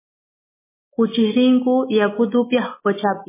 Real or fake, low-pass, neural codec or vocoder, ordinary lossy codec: real; 3.6 kHz; none; MP3, 16 kbps